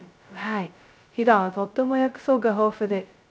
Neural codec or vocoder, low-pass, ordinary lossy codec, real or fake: codec, 16 kHz, 0.2 kbps, FocalCodec; none; none; fake